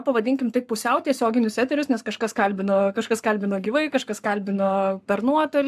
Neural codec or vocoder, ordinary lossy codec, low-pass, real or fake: codec, 44.1 kHz, 7.8 kbps, Pupu-Codec; AAC, 96 kbps; 14.4 kHz; fake